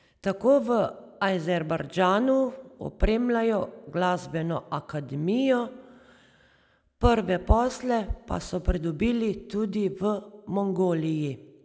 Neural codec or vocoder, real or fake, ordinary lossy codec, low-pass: none; real; none; none